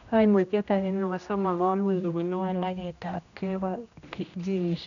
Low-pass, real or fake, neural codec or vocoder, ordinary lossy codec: 7.2 kHz; fake; codec, 16 kHz, 1 kbps, X-Codec, HuBERT features, trained on general audio; none